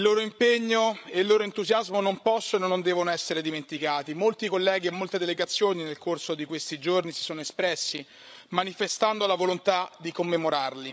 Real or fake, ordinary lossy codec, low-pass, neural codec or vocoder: fake; none; none; codec, 16 kHz, 16 kbps, FreqCodec, larger model